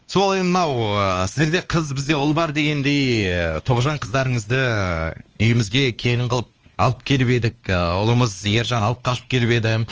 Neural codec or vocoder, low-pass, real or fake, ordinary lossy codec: codec, 16 kHz, 2 kbps, X-Codec, WavLM features, trained on Multilingual LibriSpeech; 7.2 kHz; fake; Opus, 24 kbps